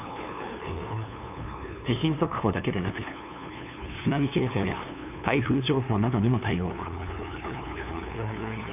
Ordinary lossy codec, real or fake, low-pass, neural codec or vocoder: none; fake; 3.6 kHz; codec, 16 kHz, 2 kbps, FunCodec, trained on LibriTTS, 25 frames a second